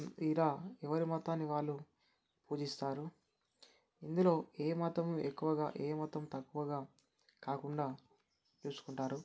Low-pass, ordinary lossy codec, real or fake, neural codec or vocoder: none; none; real; none